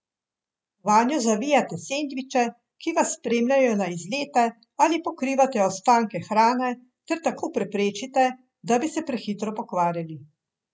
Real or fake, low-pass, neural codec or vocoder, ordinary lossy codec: real; none; none; none